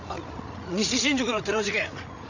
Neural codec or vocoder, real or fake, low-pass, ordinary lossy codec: codec, 16 kHz, 16 kbps, FreqCodec, larger model; fake; 7.2 kHz; none